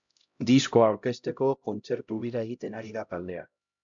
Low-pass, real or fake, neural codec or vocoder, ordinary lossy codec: 7.2 kHz; fake; codec, 16 kHz, 0.5 kbps, X-Codec, HuBERT features, trained on LibriSpeech; MP3, 64 kbps